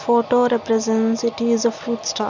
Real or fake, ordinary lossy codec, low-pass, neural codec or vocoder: real; none; 7.2 kHz; none